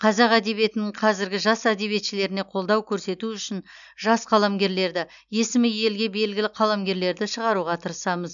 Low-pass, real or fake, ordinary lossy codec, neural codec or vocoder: 7.2 kHz; real; none; none